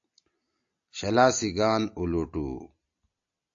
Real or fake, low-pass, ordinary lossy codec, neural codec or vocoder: real; 7.2 kHz; AAC, 64 kbps; none